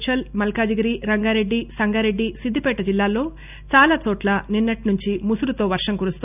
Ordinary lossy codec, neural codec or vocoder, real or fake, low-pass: none; none; real; 3.6 kHz